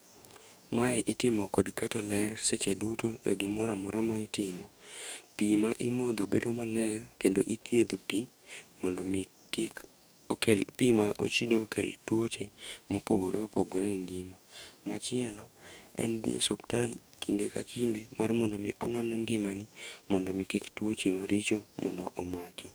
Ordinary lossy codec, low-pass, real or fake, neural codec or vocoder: none; none; fake; codec, 44.1 kHz, 2.6 kbps, DAC